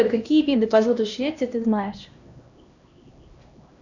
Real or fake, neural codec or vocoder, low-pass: fake; codec, 16 kHz, 1 kbps, X-Codec, HuBERT features, trained on LibriSpeech; 7.2 kHz